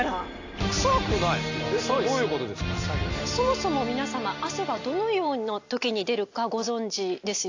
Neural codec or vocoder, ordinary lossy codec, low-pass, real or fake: none; AAC, 48 kbps; 7.2 kHz; real